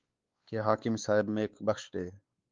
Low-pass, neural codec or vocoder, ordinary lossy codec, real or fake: 7.2 kHz; codec, 16 kHz, 4 kbps, X-Codec, WavLM features, trained on Multilingual LibriSpeech; Opus, 32 kbps; fake